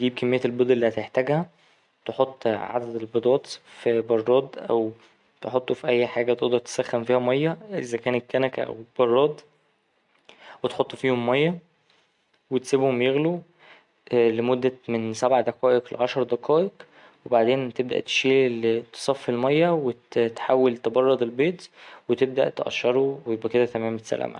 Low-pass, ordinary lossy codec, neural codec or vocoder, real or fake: 10.8 kHz; MP3, 64 kbps; none; real